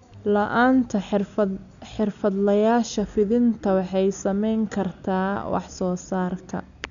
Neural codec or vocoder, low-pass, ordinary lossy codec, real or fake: none; 7.2 kHz; none; real